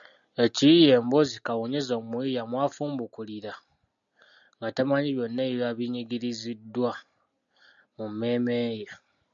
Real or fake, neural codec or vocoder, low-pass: real; none; 7.2 kHz